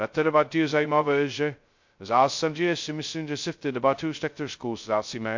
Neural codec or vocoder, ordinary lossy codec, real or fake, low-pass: codec, 16 kHz, 0.2 kbps, FocalCodec; MP3, 48 kbps; fake; 7.2 kHz